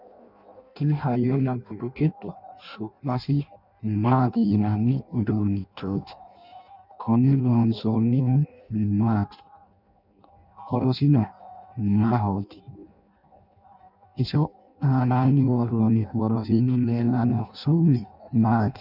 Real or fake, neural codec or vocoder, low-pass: fake; codec, 16 kHz in and 24 kHz out, 0.6 kbps, FireRedTTS-2 codec; 5.4 kHz